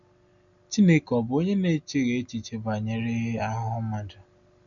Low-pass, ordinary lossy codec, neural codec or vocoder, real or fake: 7.2 kHz; none; none; real